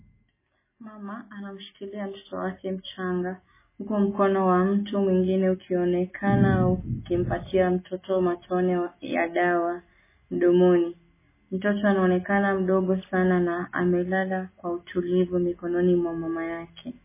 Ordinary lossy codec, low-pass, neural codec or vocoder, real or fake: MP3, 16 kbps; 3.6 kHz; none; real